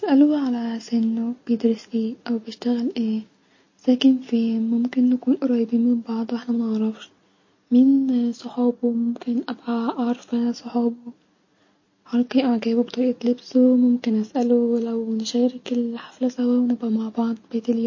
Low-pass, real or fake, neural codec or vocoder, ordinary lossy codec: 7.2 kHz; real; none; MP3, 32 kbps